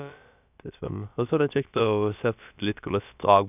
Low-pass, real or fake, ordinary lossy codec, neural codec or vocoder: 3.6 kHz; fake; none; codec, 16 kHz, about 1 kbps, DyCAST, with the encoder's durations